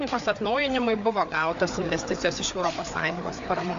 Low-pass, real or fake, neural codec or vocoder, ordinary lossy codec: 7.2 kHz; fake; codec, 16 kHz, 4 kbps, FreqCodec, larger model; AAC, 64 kbps